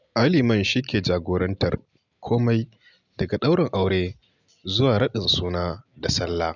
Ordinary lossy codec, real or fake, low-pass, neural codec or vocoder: none; real; 7.2 kHz; none